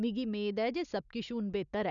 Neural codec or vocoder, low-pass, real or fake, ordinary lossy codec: none; 7.2 kHz; real; none